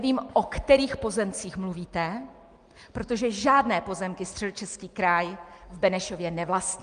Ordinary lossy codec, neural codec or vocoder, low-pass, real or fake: Opus, 32 kbps; none; 9.9 kHz; real